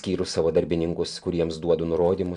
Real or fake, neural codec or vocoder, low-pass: real; none; 10.8 kHz